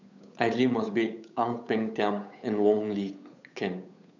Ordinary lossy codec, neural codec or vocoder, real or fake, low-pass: none; codec, 16 kHz, 8 kbps, FunCodec, trained on Chinese and English, 25 frames a second; fake; 7.2 kHz